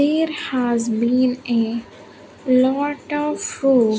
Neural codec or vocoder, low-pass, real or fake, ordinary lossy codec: none; none; real; none